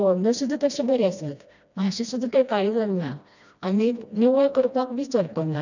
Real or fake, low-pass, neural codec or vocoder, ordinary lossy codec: fake; 7.2 kHz; codec, 16 kHz, 1 kbps, FreqCodec, smaller model; none